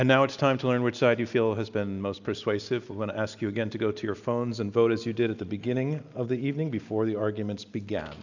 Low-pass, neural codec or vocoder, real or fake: 7.2 kHz; none; real